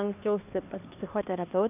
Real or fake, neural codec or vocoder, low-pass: fake; codec, 16 kHz, 2 kbps, X-Codec, HuBERT features, trained on LibriSpeech; 3.6 kHz